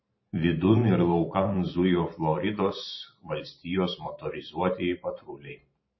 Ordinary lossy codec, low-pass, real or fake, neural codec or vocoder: MP3, 24 kbps; 7.2 kHz; fake; vocoder, 44.1 kHz, 128 mel bands every 512 samples, BigVGAN v2